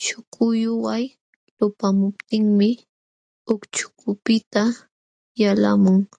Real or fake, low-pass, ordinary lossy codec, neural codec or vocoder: real; 9.9 kHz; AAC, 48 kbps; none